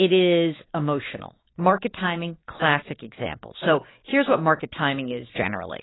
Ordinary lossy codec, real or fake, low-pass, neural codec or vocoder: AAC, 16 kbps; fake; 7.2 kHz; codec, 16 kHz, 6 kbps, DAC